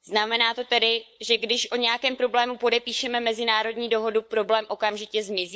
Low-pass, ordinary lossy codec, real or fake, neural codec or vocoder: none; none; fake; codec, 16 kHz, 8 kbps, FunCodec, trained on LibriTTS, 25 frames a second